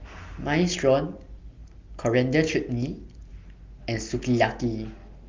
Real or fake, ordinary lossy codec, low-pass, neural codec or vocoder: real; Opus, 32 kbps; 7.2 kHz; none